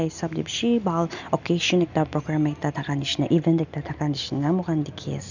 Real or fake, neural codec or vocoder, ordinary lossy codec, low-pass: real; none; none; 7.2 kHz